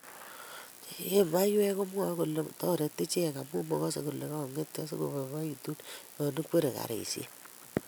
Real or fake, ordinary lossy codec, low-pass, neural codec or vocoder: real; none; none; none